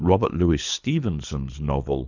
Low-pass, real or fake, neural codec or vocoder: 7.2 kHz; fake; codec, 24 kHz, 3 kbps, HILCodec